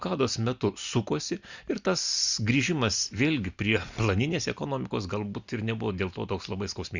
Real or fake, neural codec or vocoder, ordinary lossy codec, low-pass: real; none; Opus, 64 kbps; 7.2 kHz